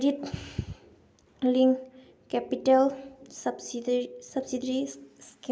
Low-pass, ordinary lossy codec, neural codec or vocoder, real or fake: none; none; none; real